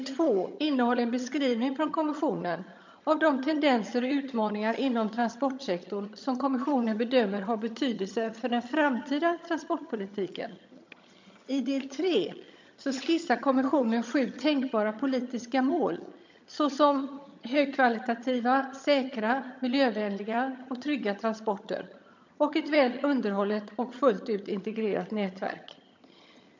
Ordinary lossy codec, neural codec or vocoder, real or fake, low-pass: MP3, 64 kbps; vocoder, 22.05 kHz, 80 mel bands, HiFi-GAN; fake; 7.2 kHz